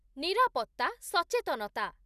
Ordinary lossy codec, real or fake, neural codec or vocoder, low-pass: none; real; none; 14.4 kHz